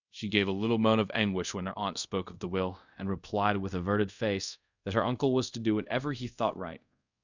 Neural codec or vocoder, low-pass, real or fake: codec, 24 kHz, 0.5 kbps, DualCodec; 7.2 kHz; fake